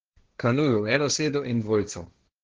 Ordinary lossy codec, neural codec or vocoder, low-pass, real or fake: Opus, 16 kbps; codec, 16 kHz, 1.1 kbps, Voila-Tokenizer; 7.2 kHz; fake